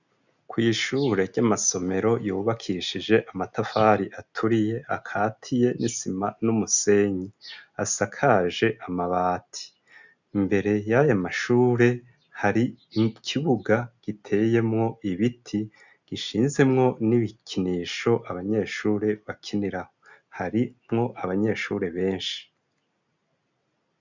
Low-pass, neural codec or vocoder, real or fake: 7.2 kHz; none; real